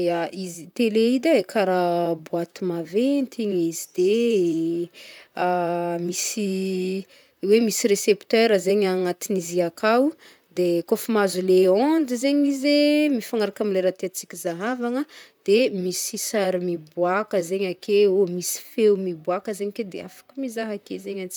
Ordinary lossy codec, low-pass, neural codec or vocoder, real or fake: none; none; vocoder, 44.1 kHz, 128 mel bands, Pupu-Vocoder; fake